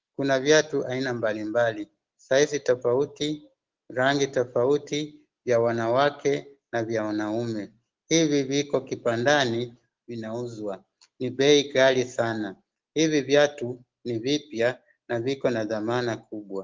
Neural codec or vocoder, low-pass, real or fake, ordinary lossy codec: none; 7.2 kHz; real; Opus, 16 kbps